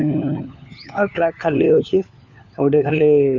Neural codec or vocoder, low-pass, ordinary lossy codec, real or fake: codec, 16 kHz, 4 kbps, FunCodec, trained on LibriTTS, 50 frames a second; 7.2 kHz; none; fake